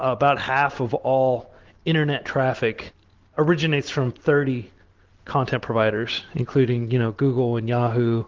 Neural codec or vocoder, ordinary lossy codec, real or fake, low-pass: none; Opus, 16 kbps; real; 7.2 kHz